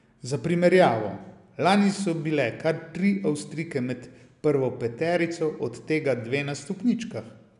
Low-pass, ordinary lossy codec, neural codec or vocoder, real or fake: 10.8 kHz; none; none; real